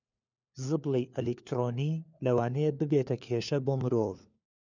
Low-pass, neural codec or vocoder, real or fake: 7.2 kHz; codec, 16 kHz, 4 kbps, FunCodec, trained on LibriTTS, 50 frames a second; fake